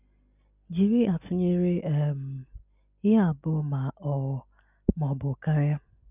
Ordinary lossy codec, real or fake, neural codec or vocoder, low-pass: none; real; none; 3.6 kHz